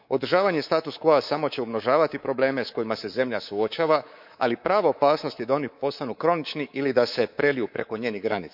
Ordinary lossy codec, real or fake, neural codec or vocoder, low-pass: none; fake; codec, 24 kHz, 3.1 kbps, DualCodec; 5.4 kHz